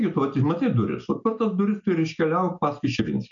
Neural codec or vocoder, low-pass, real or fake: none; 7.2 kHz; real